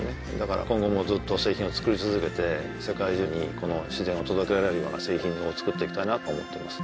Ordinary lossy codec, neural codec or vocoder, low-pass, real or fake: none; none; none; real